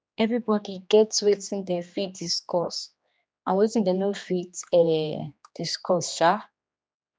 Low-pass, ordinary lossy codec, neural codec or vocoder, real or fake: none; none; codec, 16 kHz, 2 kbps, X-Codec, HuBERT features, trained on general audio; fake